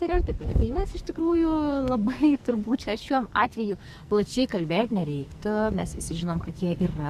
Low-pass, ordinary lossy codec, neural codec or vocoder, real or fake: 14.4 kHz; Opus, 64 kbps; codec, 44.1 kHz, 2.6 kbps, SNAC; fake